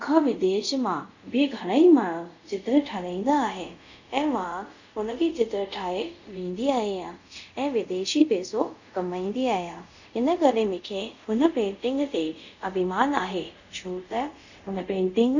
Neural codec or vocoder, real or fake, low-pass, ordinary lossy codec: codec, 24 kHz, 0.5 kbps, DualCodec; fake; 7.2 kHz; none